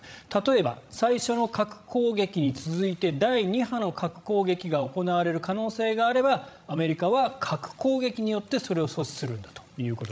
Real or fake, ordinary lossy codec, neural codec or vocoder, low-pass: fake; none; codec, 16 kHz, 16 kbps, FreqCodec, larger model; none